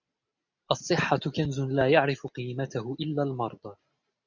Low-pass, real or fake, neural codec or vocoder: 7.2 kHz; real; none